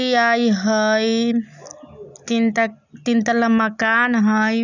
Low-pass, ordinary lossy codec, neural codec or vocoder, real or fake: 7.2 kHz; none; none; real